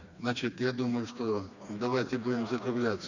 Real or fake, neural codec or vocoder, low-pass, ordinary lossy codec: fake; codec, 16 kHz, 2 kbps, FreqCodec, smaller model; 7.2 kHz; none